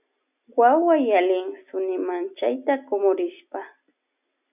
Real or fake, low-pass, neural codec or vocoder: real; 3.6 kHz; none